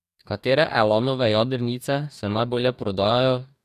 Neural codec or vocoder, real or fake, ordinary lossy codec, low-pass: codec, 44.1 kHz, 2.6 kbps, DAC; fake; none; 14.4 kHz